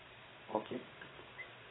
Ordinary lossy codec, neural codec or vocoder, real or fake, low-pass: AAC, 16 kbps; codec, 16 kHz in and 24 kHz out, 1 kbps, XY-Tokenizer; fake; 7.2 kHz